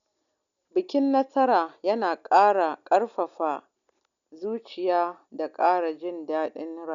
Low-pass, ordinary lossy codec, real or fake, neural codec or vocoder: 7.2 kHz; none; real; none